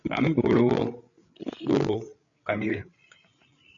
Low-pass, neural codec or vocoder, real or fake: 7.2 kHz; codec, 16 kHz, 8 kbps, FreqCodec, larger model; fake